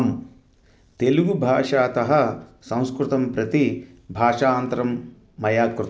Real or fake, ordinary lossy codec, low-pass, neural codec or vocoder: real; none; none; none